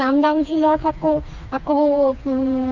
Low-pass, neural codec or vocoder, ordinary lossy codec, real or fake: 7.2 kHz; codec, 16 kHz, 2 kbps, FreqCodec, smaller model; none; fake